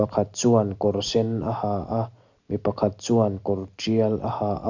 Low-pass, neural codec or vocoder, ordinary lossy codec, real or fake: 7.2 kHz; none; none; real